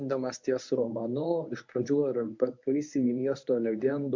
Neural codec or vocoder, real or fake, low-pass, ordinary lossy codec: codec, 24 kHz, 0.9 kbps, WavTokenizer, medium speech release version 1; fake; 7.2 kHz; MP3, 64 kbps